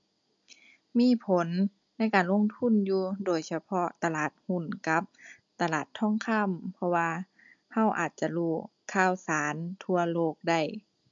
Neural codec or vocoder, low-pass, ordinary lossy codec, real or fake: none; 7.2 kHz; MP3, 64 kbps; real